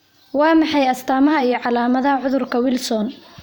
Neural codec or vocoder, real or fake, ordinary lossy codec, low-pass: vocoder, 44.1 kHz, 128 mel bands every 512 samples, BigVGAN v2; fake; none; none